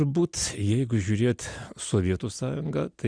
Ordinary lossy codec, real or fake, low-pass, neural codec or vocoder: Opus, 64 kbps; real; 9.9 kHz; none